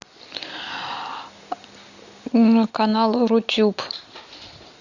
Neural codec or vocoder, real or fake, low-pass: none; real; 7.2 kHz